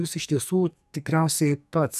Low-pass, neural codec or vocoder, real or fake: 14.4 kHz; codec, 44.1 kHz, 2.6 kbps, SNAC; fake